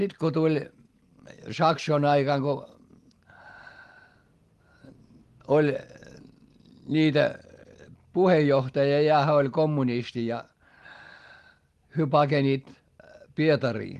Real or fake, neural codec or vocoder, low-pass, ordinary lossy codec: real; none; 14.4 kHz; Opus, 24 kbps